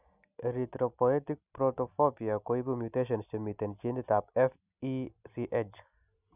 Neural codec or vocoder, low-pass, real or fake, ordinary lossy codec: none; 3.6 kHz; real; none